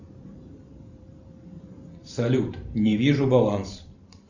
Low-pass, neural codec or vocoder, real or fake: 7.2 kHz; vocoder, 44.1 kHz, 128 mel bands every 512 samples, BigVGAN v2; fake